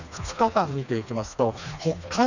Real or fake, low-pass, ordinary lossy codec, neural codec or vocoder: fake; 7.2 kHz; none; codec, 16 kHz, 2 kbps, FreqCodec, smaller model